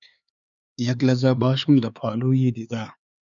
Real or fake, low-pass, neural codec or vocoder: fake; 7.2 kHz; codec, 16 kHz, 4 kbps, X-Codec, HuBERT features, trained on LibriSpeech